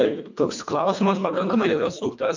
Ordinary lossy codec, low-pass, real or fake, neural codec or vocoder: MP3, 64 kbps; 7.2 kHz; fake; codec, 24 kHz, 1.5 kbps, HILCodec